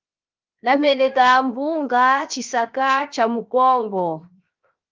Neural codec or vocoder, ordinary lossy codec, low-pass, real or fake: codec, 16 kHz, 0.7 kbps, FocalCodec; Opus, 24 kbps; 7.2 kHz; fake